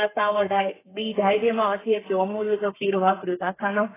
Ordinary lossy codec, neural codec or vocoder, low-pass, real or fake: AAC, 16 kbps; codec, 32 kHz, 1.9 kbps, SNAC; 3.6 kHz; fake